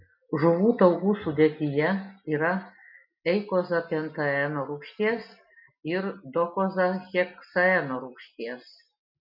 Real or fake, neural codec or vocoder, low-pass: real; none; 5.4 kHz